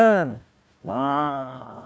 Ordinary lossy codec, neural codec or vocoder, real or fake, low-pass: none; codec, 16 kHz, 1 kbps, FunCodec, trained on Chinese and English, 50 frames a second; fake; none